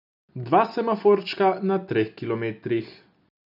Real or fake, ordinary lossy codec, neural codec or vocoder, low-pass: real; none; none; 5.4 kHz